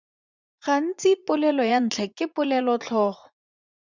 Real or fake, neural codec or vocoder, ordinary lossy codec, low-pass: fake; vocoder, 44.1 kHz, 80 mel bands, Vocos; Opus, 64 kbps; 7.2 kHz